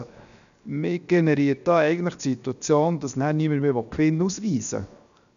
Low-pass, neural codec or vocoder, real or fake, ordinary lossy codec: 7.2 kHz; codec, 16 kHz, 0.7 kbps, FocalCodec; fake; none